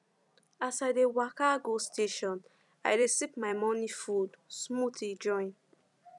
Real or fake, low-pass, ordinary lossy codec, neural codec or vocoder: fake; 10.8 kHz; none; vocoder, 44.1 kHz, 128 mel bands every 256 samples, BigVGAN v2